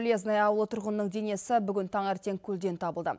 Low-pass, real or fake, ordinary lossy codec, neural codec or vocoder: none; real; none; none